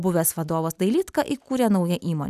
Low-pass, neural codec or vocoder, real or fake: 14.4 kHz; none; real